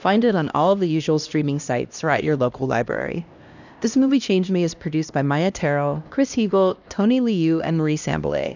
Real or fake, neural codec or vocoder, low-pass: fake; codec, 16 kHz, 1 kbps, X-Codec, HuBERT features, trained on LibriSpeech; 7.2 kHz